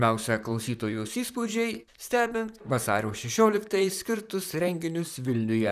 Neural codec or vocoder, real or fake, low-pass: vocoder, 44.1 kHz, 128 mel bands, Pupu-Vocoder; fake; 14.4 kHz